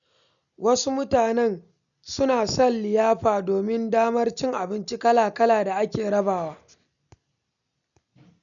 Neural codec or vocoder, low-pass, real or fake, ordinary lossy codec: none; 7.2 kHz; real; none